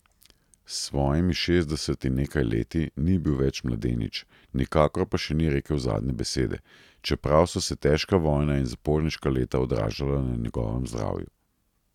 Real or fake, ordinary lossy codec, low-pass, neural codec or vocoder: real; none; 19.8 kHz; none